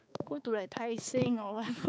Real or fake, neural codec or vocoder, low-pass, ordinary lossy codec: fake; codec, 16 kHz, 4 kbps, X-Codec, HuBERT features, trained on balanced general audio; none; none